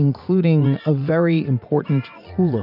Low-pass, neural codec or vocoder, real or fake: 5.4 kHz; vocoder, 44.1 kHz, 80 mel bands, Vocos; fake